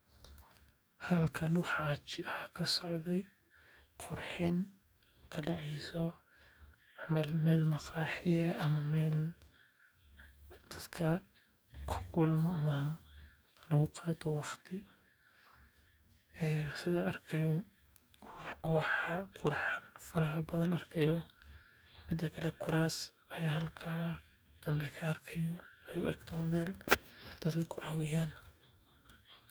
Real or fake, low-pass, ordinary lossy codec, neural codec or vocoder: fake; none; none; codec, 44.1 kHz, 2.6 kbps, DAC